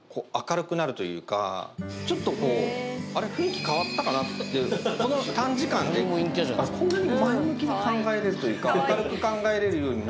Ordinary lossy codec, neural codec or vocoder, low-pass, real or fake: none; none; none; real